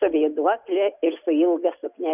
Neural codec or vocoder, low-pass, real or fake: none; 3.6 kHz; real